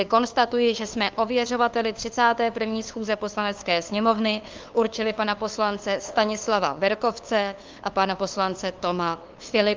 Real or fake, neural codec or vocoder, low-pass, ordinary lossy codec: fake; codec, 16 kHz, 2 kbps, FunCodec, trained on LibriTTS, 25 frames a second; 7.2 kHz; Opus, 32 kbps